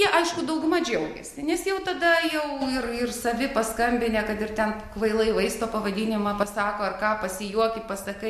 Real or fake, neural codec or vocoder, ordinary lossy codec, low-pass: real; none; MP3, 64 kbps; 14.4 kHz